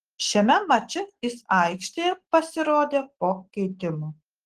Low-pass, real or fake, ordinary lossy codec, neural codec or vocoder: 14.4 kHz; real; Opus, 16 kbps; none